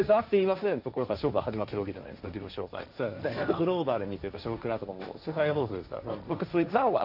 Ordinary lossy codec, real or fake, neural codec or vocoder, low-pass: none; fake; codec, 16 kHz, 1.1 kbps, Voila-Tokenizer; 5.4 kHz